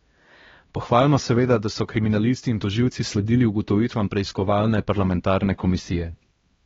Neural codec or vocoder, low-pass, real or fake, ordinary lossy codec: codec, 16 kHz, 1 kbps, X-Codec, HuBERT features, trained on LibriSpeech; 7.2 kHz; fake; AAC, 24 kbps